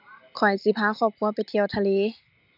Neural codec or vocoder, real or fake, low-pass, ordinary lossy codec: none; real; 5.4 kHz; none